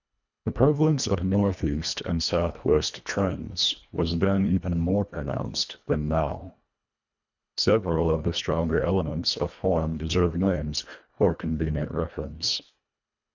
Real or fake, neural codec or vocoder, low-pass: fake; codec, 24 kHz, 1.5 kbps, HILCodec; 7.2 kHz